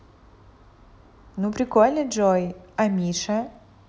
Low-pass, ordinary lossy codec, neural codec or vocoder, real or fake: none; none; none; real